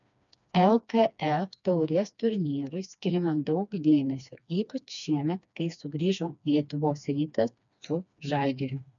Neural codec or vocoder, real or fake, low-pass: codec, 16 kHz, 2 kbps, FreqCodec, smaller model; fake; 7.2 kHz